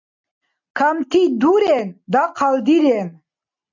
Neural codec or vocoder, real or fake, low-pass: none; real; 7.2 kHz